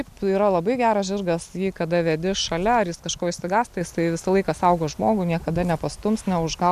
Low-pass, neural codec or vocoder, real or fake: 14.4 kHz; none; real